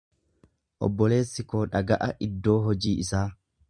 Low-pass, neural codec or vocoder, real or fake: 9.9 kHz; vocoder, 44.1 kHz, 128 mel bands every 256 samples, BigVGAN v2; fake